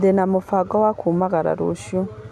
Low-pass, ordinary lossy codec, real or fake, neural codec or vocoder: 14.4 kHz; none; real; none